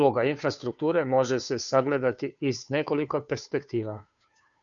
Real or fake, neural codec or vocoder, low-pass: fake; codec, 16 kHz, 2 kbps, FunCodec, trained on Chinese and English, 25 frames a second; 7.2 kHz